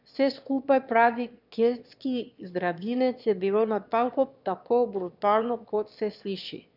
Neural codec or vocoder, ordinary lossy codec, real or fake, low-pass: autoencoder, 22.05 kHz, a latent of 192 numbers a frame, VITS, trained on one speaker; none; fake; 5.4 kHz